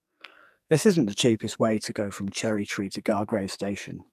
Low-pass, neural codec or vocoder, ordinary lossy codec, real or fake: 14.4 kHz; codec, 44.1 kHz, 2.6 kbps, SNAC; none; fake